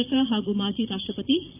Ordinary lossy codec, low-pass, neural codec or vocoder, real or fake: none; 3.6 kHz; vocoder, 44.1 kHz, 128 mel bands, Pupu-Vocoder; fake